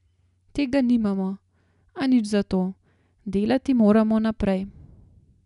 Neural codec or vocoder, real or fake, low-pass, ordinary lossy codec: none; real; 10.8 kHz; none